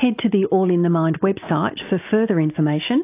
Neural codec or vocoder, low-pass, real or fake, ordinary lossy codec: none; 3.6 kHz; real; AAC, 24 kbps